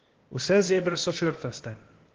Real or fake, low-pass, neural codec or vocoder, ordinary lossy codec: fake; 7.2 kHz; codec, 16 kHz, 0.8 kbps, ZipCodec; Opus, 16 kbps